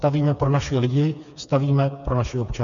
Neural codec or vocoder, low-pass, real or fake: codec, 16 kHz, 4 kbps, FreqCodec, smaller model; 7.2 kHz; fake